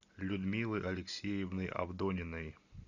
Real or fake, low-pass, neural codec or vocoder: real; 7.2 kHz; none